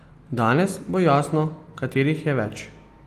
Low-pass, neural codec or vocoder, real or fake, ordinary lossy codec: 14.4 kHz; none; real; Opus, 24 kbps